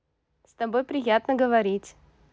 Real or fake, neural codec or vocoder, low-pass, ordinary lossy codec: real; none; none; none